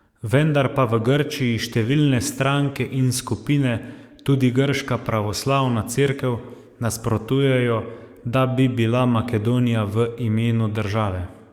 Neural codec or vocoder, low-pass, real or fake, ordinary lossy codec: codec, 44.1 kHz, 7.8 kbps, DAC; 19.8 kHz; fake; Opus, 64 kbps